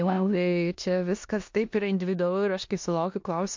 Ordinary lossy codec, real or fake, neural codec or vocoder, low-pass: MP3, 48 kbps; fake; codec, 16 kHz in and 24 kHz out, 0.9 kbps, LongCat-Audio-Codec, four codebook decoder; 7.2 kHz